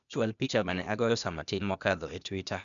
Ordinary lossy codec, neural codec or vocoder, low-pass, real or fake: none; codec, 16 kHz, 0.8 kbps, ZipCodec; 7.2 kHz; fake